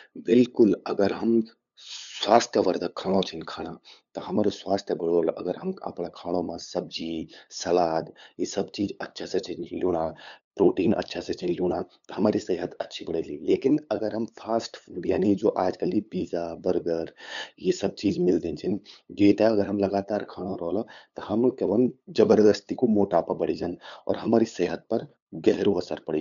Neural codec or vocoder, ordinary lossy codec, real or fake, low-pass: codec, 16 kHz, 8 kbps, FunCodec, trained on LibriTTS, 25 frames a second; none; fake; 7.2 kHz